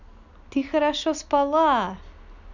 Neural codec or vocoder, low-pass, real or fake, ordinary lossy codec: none; 7.2 kHz; real; none